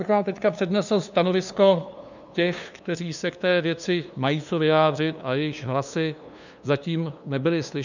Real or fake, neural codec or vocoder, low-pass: fake; codec, 16 kHz, 2 kbps, FunCodec, trained on LibriTTS, 25 frames a second; 7.2 kHz